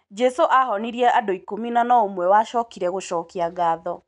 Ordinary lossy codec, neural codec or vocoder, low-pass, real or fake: none; none; 10.8 kHz; real